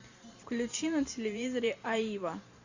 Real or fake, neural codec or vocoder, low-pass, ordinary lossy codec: fake; vocoder, 44.1 kHz, 128 mel bands every 512 samples, BigVGAN v2; 7.2 kHz; Opus, 64 kbps